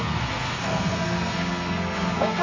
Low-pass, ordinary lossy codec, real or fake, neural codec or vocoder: 7.2 kHz; MP3, 32 kbps; fake; codec, 32 kHz, 1.9 kbps, SNAC